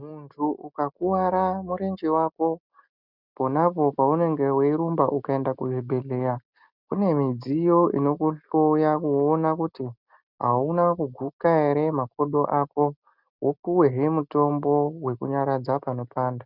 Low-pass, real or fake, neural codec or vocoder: 5.4 kHz; real; none